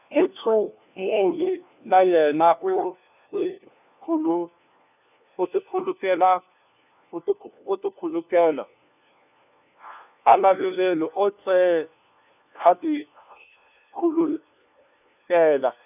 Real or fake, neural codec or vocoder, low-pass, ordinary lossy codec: fake; codec, 16 kHz, 1 kbps, FunCodec, trained on LibriTTS, 50 frames a second; 3.6 kHz; none